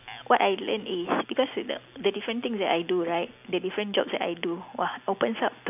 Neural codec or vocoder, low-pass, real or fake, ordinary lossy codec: none; 3.6 kHz; real; none